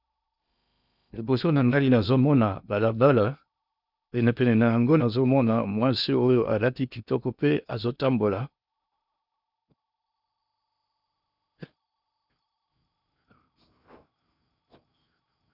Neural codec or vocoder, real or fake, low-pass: codec, 16 kHz in and 24 kHz out, 0.8 kbps, FocalCodec, streaming, 65536 codes; fake; 5.4 kHz